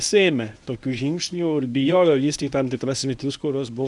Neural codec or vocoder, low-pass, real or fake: codec, 24 kHz, 0.9 kbps, WavTokenizer, medium speech release version 1; 10.8 kHz; fake